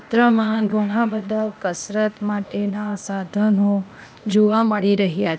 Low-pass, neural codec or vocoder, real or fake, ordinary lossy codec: none; codec, 16 kHz, 0.8 kbps, ZipCodec; fake; none